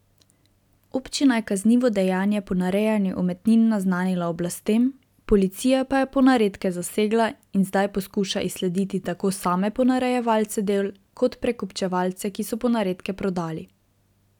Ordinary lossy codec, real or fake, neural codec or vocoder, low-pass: none; real; none; 19.8 kHz